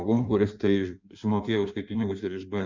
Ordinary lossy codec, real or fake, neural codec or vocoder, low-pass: MP3, 64 kbps; fake; codec, 16 kHz in and 24 kHz out, 1.1 kbps, FireRedTTS-2 codec; 7.2 kHz